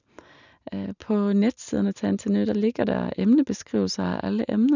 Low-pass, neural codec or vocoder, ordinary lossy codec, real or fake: 7.2 kHz; none; none; real